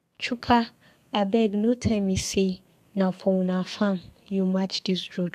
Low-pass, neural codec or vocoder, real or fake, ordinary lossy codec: 14.4 kHz; codec, 32 kHz, 1.9 kbps, SNAC; fake; none